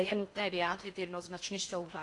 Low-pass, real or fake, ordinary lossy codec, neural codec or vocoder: 10.8 kHz; fake; AAC, 48 kbps; codec, 16 kHz in and 24 kHz out, 0.6 kbps, FocalCodec, streaming, 4096 codes